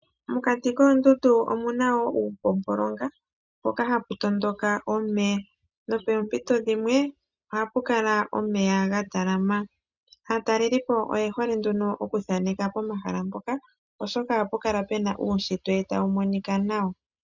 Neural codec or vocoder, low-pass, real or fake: none; 7.2 kHz; real